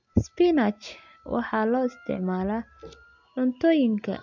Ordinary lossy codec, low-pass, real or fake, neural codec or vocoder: MP3, 64 kbps; 7.2 kHz; real; none